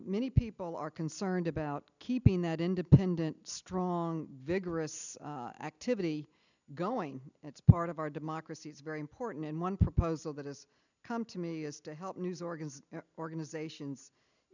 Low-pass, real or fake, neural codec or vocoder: 7.2 kHz; real; none